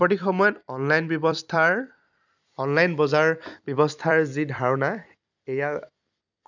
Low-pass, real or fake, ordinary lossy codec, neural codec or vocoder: 7.2 kHz; fake; none; vocoder, 44.1 kHz, 128 mel bands every 256 samples, BigVGAN v2